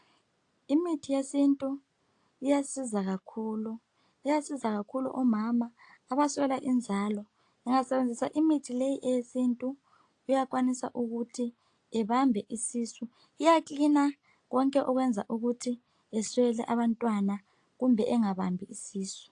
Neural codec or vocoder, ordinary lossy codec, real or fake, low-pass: none; AAC, 48 kbps; real; 9.9 kHz